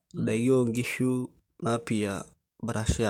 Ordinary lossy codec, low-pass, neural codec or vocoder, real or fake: MP3, 96 kbps; 19.8 kHz; codec, 44.1 kHz, 7.8 kbps, DAC; fake